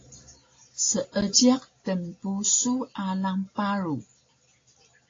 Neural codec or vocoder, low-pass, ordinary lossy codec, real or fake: none; 7.2 kHz; AAC, 32 kbps; real